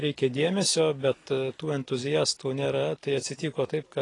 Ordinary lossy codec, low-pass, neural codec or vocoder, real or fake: AAC, 32 kbps; 10.8 kHz; none; real